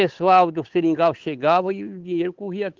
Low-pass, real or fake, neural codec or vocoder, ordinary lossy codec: 7.2 kHz; fake; codec, 16 kHz, 8 kbps, FunCodec, trained on Chinese and English, 25 frames a second; Opus, 16 kbps